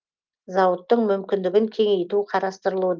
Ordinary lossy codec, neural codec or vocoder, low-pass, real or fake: Opus, 24 kbps; none; 7.2 kHz; real